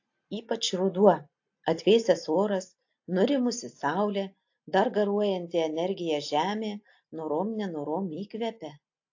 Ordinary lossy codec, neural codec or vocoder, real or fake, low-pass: AAC, 48 kbps; none; real; 7.2 kHz